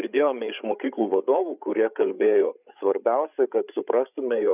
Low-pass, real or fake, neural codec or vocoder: 3.6 kHz; fake; codec, 16 kHz, 8 kbps, FreqCodec, larger model